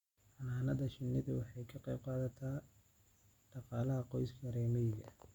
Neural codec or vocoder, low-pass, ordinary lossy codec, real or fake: none; 19.8 kHz; Opus, 64 kbps; real